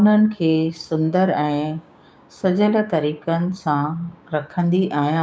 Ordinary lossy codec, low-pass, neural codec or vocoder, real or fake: none; none; codec, 16 kHz, 6 kbps, DAC; fake